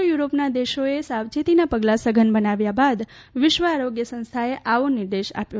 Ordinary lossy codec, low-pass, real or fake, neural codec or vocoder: none; none; real; none